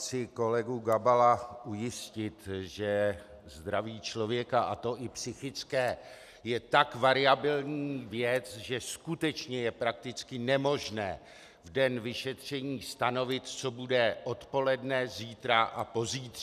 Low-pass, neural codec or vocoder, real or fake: 14.4 kHz; none; real